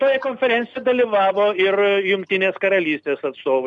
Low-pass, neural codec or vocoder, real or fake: 10.8 kHz; none; real